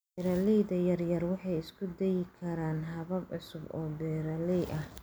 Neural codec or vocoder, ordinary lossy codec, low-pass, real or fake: none; none; none; real